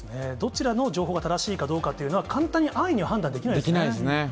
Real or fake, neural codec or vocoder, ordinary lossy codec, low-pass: real; none; none; none